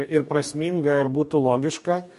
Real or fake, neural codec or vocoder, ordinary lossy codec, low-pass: fake; codec, 44.1 kHz, 2.6 kbps, DAC; MP3, 48 kbps; 14.4 kHz